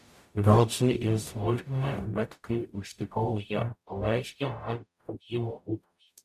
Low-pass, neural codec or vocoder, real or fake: 14.4 kHz; codec, 44.1 kHz, 0.9 kbps, DAC; fake